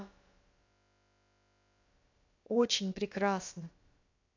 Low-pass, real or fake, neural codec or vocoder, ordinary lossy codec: 7.2 kHz; fake; codec, 16 kHz, about 1 kbps, DyCAST, with the encoder's durations; AAC, 48 kbps